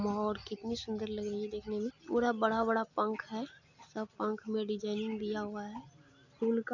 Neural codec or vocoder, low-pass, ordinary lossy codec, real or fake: none; 7.2 kHz; none; real